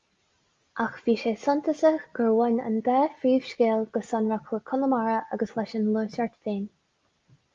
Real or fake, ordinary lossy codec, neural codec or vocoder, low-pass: real; Opus, 32 kbps; none; 7.2 kHz